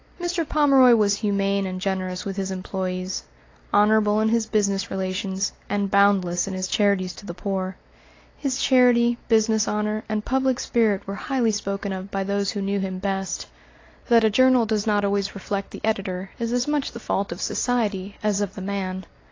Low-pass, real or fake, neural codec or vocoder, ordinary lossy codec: 7.2 kHz; real; none; AAC, 32 kbps